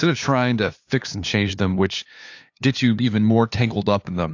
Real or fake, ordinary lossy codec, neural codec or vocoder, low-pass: fake; AAC, 48 kbps; codec, 16 kHz, 2 kbps, FunCodec, trained on LibriTTS, 25 frames a second; 7.2 kHz